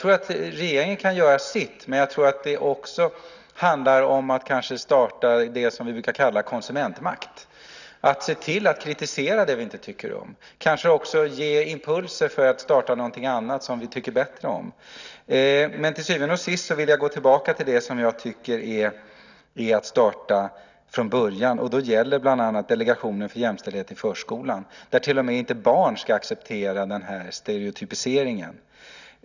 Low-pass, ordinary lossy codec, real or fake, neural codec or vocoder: 7.2 kHz; none; real; none